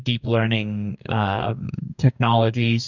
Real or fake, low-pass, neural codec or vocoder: fake; 7.2 kHz; codec, 44.1 kHz, 2.6 kbps, DAC